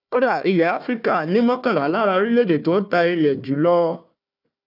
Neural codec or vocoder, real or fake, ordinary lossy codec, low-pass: codec, 16 kHz, 1 kbps, FunCodec, trained on Chinese and English, 50 frames a second; fake; none; 5.4 kHz